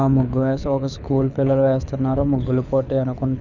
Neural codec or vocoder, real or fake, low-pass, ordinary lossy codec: codec, 24 kHz, 6 kbps, HILCodec; fake; 7.2 kHz; none